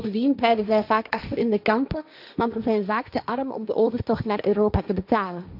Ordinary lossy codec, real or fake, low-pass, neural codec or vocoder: none; fake; 5.4 kHz; codec, 16 kHz, 1.1 kbps, Voila-Tokenizer